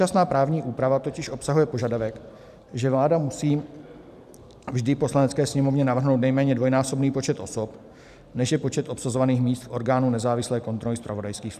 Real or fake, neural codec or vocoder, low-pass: fake; vocoder, 44.1 kHz, 128 mel bands every 512 samples, BigVGAN v2; 14.4 kHz